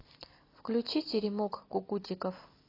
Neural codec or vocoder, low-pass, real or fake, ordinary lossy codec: none; 5.4 kHz; real; AAC, 24 kbps